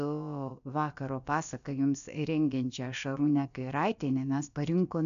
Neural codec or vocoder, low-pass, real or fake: codec, 16 kHz, about 1 kbps, DyCAST, with the encoder's durations; 7.2 kHz; fake